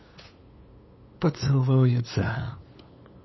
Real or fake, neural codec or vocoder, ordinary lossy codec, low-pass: fake; codec, 16 kHz, 2 kbps, FunCodec, trained on LibriTTS, 25 frames a second; MP3, 24 kbps; 7.2 kHz